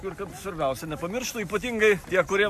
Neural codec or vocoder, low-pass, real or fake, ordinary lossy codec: none; 14.4 kHz; real; AAC, 96 kbps